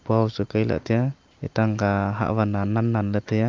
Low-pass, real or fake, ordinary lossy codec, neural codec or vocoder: 7.2 kHz; real; Opus, 32 kbps; none